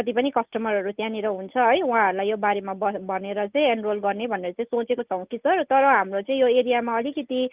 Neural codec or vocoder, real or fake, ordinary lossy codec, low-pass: none; real; Opus, 24 kbps; 3.6 kHz